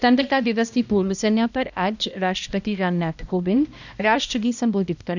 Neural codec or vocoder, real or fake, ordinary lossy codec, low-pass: codec, 16 kHz, 1 kbps, X-Codec, HuBERT features, trained on balanced general audio; fake; none; 7.2 kHz